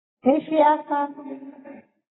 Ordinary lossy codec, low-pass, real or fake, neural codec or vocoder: AAC, 16 kbps; 7.2 kHz; real; none